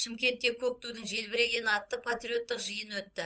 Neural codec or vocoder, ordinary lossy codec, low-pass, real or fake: codec, 16 kHz, 8 kbps, FunCodec, trained on Chinese and English, 25 frames a second; none; none; fake